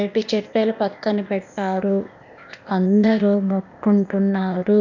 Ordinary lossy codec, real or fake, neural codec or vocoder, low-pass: none; fake; codec, 16 kHz, 0.8 kbps, ZipCodec; 7.2 kHz